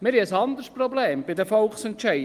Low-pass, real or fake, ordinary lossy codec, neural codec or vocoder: 14.4 kHz; real; Opus, 32 kbps; none